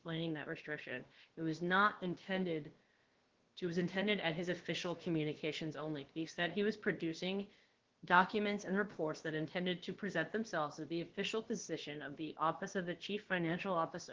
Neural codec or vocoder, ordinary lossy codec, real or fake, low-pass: codec, 16 kHz, about 1 kbps, DyCAST, with the encoder's durations; Opus, 16 kbps; fake; 7.2 kHz